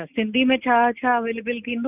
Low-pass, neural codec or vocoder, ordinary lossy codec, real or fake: 3.6 kHz; none; none; real